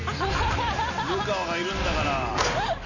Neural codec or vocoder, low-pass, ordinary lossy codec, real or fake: none; 7.2 kHz; none; real